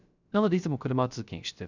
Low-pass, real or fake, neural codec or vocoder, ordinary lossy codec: 7.2 kHz; fake; codec, 16 kHz, 0.3 kbps, FocalCodec; none